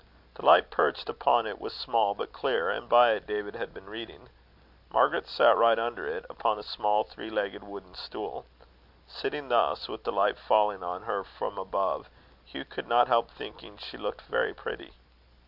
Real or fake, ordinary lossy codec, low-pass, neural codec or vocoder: real; AAC, 48 kbps; 5.4 kHz; none